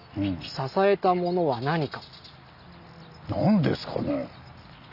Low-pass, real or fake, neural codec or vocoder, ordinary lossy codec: 5.4 kHz; real; none; Opus, 64 kbps